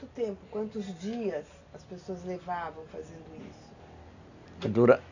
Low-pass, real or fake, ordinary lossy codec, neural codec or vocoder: 7.2 kHz; real; none; none